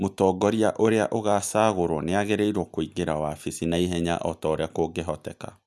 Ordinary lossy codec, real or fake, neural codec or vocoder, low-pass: none; real; none; none